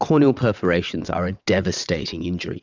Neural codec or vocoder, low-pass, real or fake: vocoder, 22.05 kHz, 80 mel bands, WaveNeXt; 7.2 kHz; fake